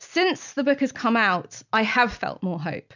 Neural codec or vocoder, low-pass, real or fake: none; 7.2 kHz; real